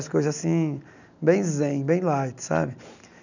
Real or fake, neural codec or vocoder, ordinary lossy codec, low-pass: real; none; none; 7.2 kHz